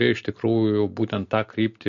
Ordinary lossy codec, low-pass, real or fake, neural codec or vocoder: MP3, 48 kbps; 7.2 kHz; real; none